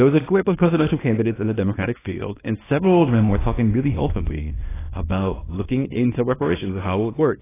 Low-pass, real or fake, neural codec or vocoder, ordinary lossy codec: 3.6 kHz; fake; codec, 24 kHz, 0.9 kbps, WavTokenizer, small release; AAC, 16 kbps